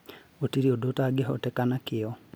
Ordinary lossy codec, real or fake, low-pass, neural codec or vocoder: none; real; none; none